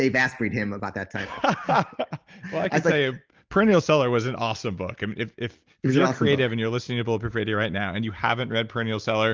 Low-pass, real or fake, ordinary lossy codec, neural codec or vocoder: 7.2 kHz; real; Opus, 24 kbps; none